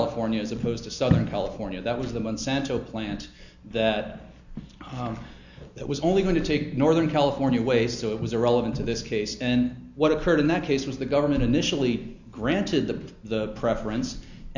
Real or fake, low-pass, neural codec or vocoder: real; 7.2 kHz; none